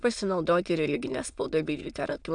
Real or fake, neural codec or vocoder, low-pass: fake; autoencoder, 22.05 kHz, a latent of 192 numbers a frame, VITS, trained on many speakers; 9.9 kHz